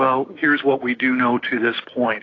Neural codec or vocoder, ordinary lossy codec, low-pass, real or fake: codec, 16 kHz, 6 kbps, DAC; AAC, 32 kbps; 7.2 kHz; fake